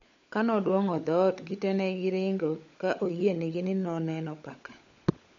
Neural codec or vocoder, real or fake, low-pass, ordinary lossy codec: codec, 16 kHz, 16 kbps, FunCodec, trained on LibriTTS, 50 frames a second; fake; 7.2 kHz; MP3, 48 kbps